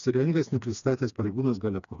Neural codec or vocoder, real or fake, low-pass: codec, 16 kHz, 2 kbps, FreqCodec, smaller model; fake; 7.2 kHz